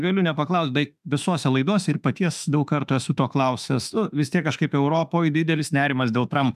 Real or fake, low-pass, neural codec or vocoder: fake; 14.4 kHz; autoencoder, 48 kHz, 32 numbers a frame, DAC-VAE, trained on Japanese speech